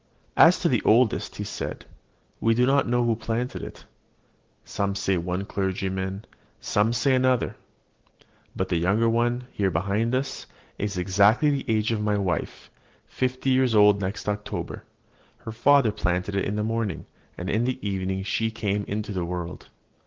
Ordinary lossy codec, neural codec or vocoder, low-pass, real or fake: Opus, 16 kbps; none; 7.2 kHz; real